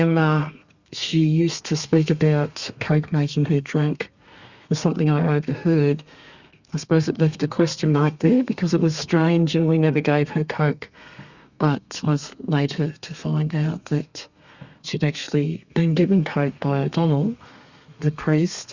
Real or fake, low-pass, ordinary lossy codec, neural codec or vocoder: fake; 7.2 kHz; Opus, 64 kbps; codec, 32 kHz, 1.9 kbps, SNAC